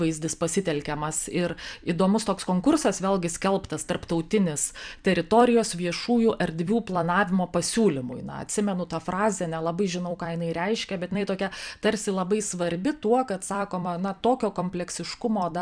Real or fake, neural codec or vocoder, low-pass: fake; vocoder, 44.1 kHz, 128 mel bands every 256 samples, BigVGAN v2; 9.9 kHz